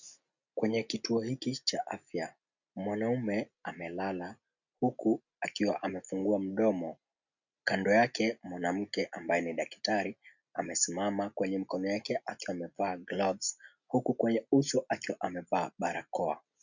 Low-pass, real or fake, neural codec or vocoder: 7.2 kHz; real; none